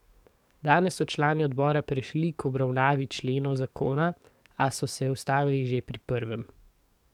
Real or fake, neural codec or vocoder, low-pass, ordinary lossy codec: fake; codec, 44.1 kHz, 7.8 kbps, DAC; 19.8 kHz; none